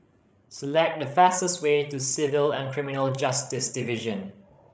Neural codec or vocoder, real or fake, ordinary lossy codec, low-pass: codec, 16 kHz, 16 kbps, FreqCodec, larger model; fake; none; none